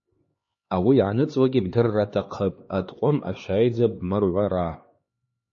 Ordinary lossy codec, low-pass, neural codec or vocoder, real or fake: MP3, 32 kbps; 7.2 kHz; codec, 16 kHz, 2 kbps, X-Codec, HuBERT features, trained on LibriSpeech; fake